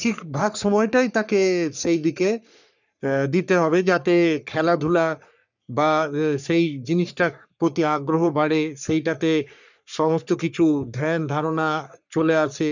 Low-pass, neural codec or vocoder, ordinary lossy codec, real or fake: 7.2 kHz; codec, 44.1 kHz, 3.4 kbps, Pupu-Codec; none; fake